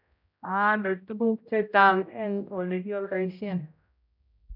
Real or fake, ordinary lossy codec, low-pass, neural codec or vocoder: fake; none; 5.4 kHz; codec, 16 kHz, 0.5 kbps, X-Codec, HuBERT features, trained on general audio